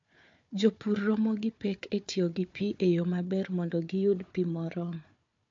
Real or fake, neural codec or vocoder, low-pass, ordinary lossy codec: fake; codec, 16 kHz, 4 kbps, FunCodec, trained on Chinese and English, 50 frames a second; 7.2 kHz; MP3, 48 kbps